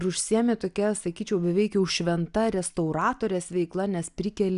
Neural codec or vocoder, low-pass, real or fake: none; 10.8 kHz; real